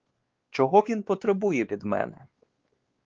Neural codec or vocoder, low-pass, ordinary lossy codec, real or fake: codec, 16 kHz, 2 kbps, X-Codec, WavLM features, trained on Multilingual LibriSpeech; 7.2 kHz; Opus, 16 kbps; fake